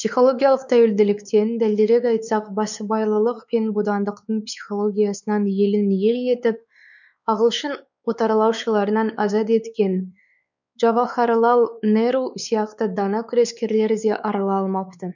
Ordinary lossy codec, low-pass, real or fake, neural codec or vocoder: none; 7.2 kHz; fake; codec, 16 kHz, 4 kbps, X-Codec, WavLM features, trained on Multilingual LibriSpeech